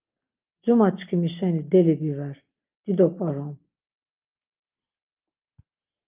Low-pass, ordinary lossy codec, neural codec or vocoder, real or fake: 3.6 kHz; Opus, 32 kbps; none; real